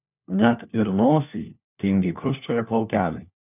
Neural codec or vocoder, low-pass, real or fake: codec, 16 kHz, 1 kbps, FunCodec, trained on LibriTTS, 50 frames a second; 3.6 kHz; fake